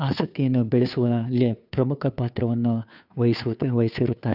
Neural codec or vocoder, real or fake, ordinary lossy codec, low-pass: codec, 16 kHz, 2 kbps, FunCodec, trained on Chinese and English, 25 frames a second; fake; none; 5.4 kHz